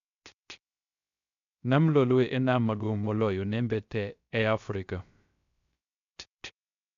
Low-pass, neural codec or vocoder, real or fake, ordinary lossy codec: 7.2 kHz; codec, 16 kHz, 0.3 kbps, FocalCodec; fake; none